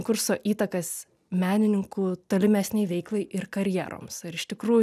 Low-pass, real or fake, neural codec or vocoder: 14.4 kHz; real; none